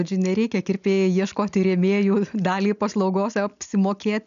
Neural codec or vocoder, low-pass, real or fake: none; 7.2 kHz; real